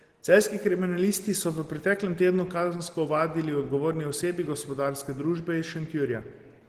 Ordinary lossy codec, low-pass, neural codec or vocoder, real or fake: Opus, 16 kbps; 14.4 kHz; none; real